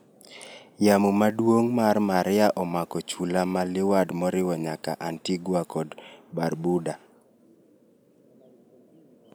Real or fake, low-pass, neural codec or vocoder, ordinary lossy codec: real; none; none; none